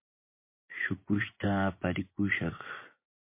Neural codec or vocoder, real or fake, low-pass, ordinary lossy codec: vocoder, 24 kHz, 100 mel bands, Vocos; fake; 3.6 kHz; MP3, 24 kbps